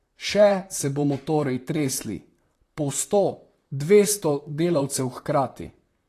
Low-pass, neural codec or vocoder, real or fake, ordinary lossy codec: 14.4 kHz; vocoder, 44.1 kHz, 128 mel bands, Pupu-Vocoder; fake; AAC, 48 kbps